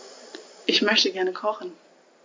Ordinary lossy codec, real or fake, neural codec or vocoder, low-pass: MP3, 48 kbps; real; none; 7.2 kHz